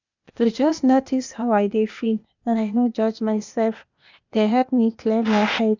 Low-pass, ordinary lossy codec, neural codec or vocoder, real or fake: 7.2 kHz; none; codec, 16 kHz, 0.8 kbps, ZipCodec; fake